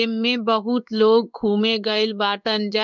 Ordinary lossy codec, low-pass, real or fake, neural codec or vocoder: none; 7.2 kHz; fake; codec, 16 kHz in and 24 kHz out, 1 kbps, XY-Tokenizer